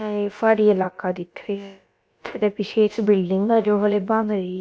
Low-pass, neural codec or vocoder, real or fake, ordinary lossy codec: none; codec, 16 kHz, about 1 kbps, DyCAST, with the encoder's durations; fake; none